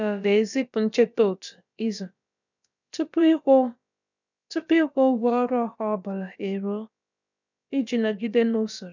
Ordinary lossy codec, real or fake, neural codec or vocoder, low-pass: none; fake; codec, 16 kHz, about 1 kbps, DyCAST, with the encoder's durations; 7.2 kHz